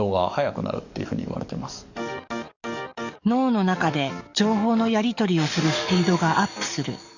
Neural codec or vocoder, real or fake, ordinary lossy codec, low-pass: codec, 44.1 kHz, 7.8 kbps, DAC; fake; none; 7.2 kHz